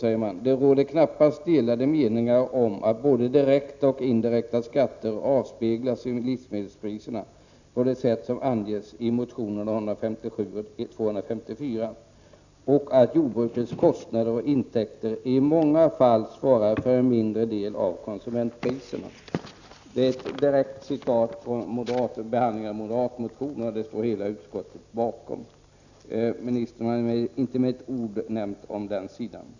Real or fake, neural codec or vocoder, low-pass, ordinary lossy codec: real; none; 7.2 kHz; none